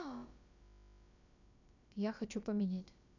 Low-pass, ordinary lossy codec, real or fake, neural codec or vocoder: 7.2 kHz; none; fake; codec, 16 kHz, about 1 kbps, DyCAST, with the encoder's durations